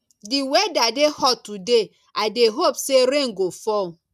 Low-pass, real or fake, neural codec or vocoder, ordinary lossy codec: 14.4 kHz; real; none; none